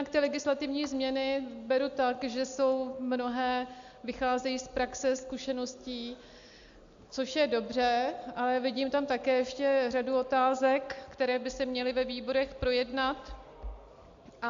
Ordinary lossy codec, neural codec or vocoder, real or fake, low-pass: AAC, 64 kbps; none; real; 7.2 kHz